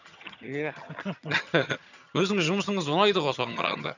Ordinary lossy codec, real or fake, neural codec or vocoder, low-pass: none; fake; vocoder, 22.05 kHz, 80 mel bands, HiFi-GAN; 7.2 kHz